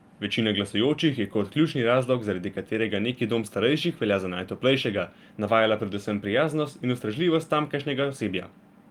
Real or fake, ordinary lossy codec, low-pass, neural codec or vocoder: real; Opus, 32 kbps; 19.8 kHz; none